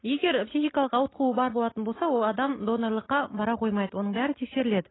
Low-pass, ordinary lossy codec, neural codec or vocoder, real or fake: 7.2 kHz; AAC, 16 kbps; none; real